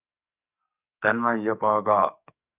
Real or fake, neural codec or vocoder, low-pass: fake; codec, 44.1 kHz, 2.6 kbps, SNAC; 3.6 kHz